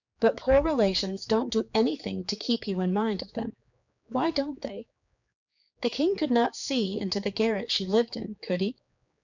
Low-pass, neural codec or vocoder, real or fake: 7.2 kHz; codec, 16 kHz, 4 kbps, X-Codec, HuBERT features, trained on general audio; fake